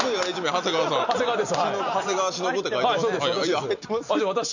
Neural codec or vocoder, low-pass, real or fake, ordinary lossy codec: none; 7.2 kHz; real; none